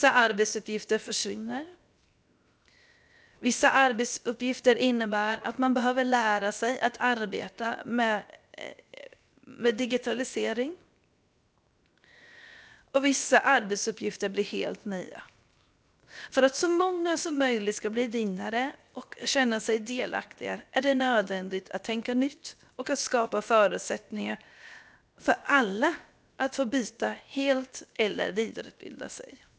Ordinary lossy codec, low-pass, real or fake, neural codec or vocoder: none; none; fake; codec, 16 kHz, 0.7 kbps, FocalCodec